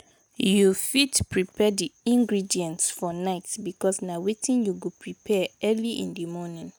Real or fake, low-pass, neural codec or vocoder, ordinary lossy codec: real; none; none; none